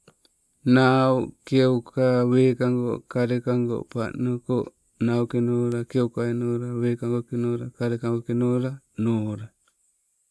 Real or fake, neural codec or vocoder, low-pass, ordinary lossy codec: real; none; none; none